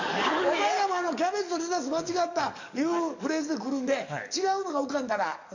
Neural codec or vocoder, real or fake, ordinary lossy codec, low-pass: codec, 44.1 kHz, 7.8 kbps, DAC; fake; none; 7.2 kHz